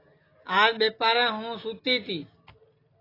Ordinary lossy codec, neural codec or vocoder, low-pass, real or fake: AAC, 24 kbps; none; 5.4 kHz; real